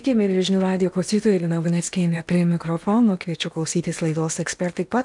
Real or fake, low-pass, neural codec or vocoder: fake; 10.8 kHz; codec, 16 kHz in and 24 kHz out, 0.8 kbps, FocalCodec, streaming, 65536 codes